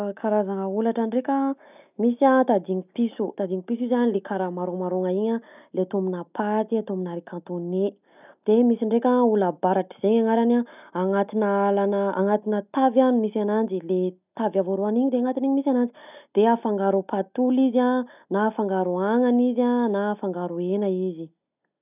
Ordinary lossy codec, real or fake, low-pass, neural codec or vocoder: none; real; 3.6 kHz; none